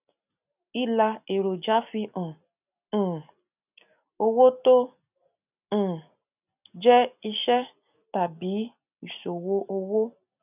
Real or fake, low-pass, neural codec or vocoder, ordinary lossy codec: real; 3.6 kHz; none; none